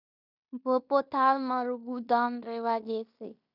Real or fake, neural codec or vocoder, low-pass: fake; codec, 16 kHz in and 24 kHz out, 0.9 kbps, LongCat-Audio-Codec, fine tuned four codebook decoder; 5.4 kHz